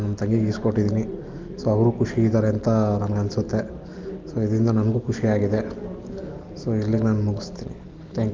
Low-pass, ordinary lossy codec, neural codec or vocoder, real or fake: 7.2 kHz; Opus, 32 kbps; none; real